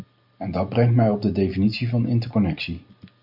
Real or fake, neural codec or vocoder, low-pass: real; none; 5.4 kHz